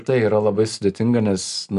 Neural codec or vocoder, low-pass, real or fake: none; 10.8 kHz; real